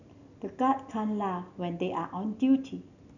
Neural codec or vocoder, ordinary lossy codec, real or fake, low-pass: none; none; real; 7.2 kHz